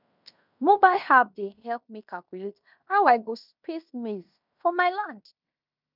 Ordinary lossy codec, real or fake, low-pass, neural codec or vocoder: none; fake; 5.4 kHz; codec, 16 kHz in and 24 kHz out, 0.9 kbps, LongCat-Audio-Codec, fine tuned four codebook decoder